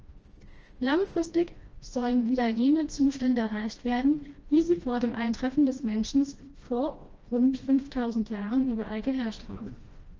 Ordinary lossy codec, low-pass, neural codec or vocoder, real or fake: Opus, 24 kbps; 7.2 kHz; codec, 16 kHz, 1 kbps, FreqCodec, smaller model; fake